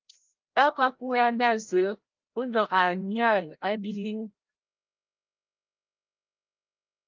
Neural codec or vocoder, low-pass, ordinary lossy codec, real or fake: codec, 16 kHz, 0.5 kbps, FreqCodec, larger model; 7.2 kHz; Opus, 32 kbps; fake